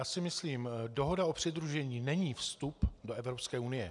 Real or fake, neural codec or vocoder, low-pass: real; none; 10.8 kHz